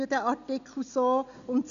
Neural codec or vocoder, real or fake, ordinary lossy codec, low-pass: none; real; MP3, 96 kbps; 7.2 kHz